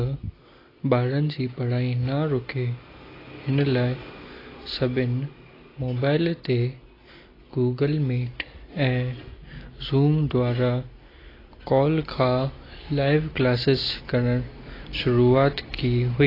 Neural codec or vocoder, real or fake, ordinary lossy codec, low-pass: none; real; AAC, 24 kbps; 5.4 kHz